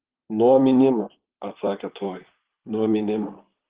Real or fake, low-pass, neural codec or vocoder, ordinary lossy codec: fake; 3.6 kHz; vocoder, 44.1 kHz, 128 mel bands, Pupu-Vocoder; Opus, 16 kbps